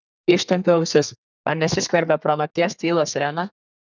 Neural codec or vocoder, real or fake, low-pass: codec, 32 kHz, 1.9 kbps, SNAC; fake; 7.2 kHz